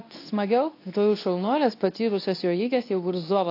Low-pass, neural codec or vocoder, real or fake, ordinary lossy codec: 5.4 kHz; codec, 24 kHz, 0.9 kbps, WavTokenizer, medium speech release version 2; fake; AAC, 32 kbps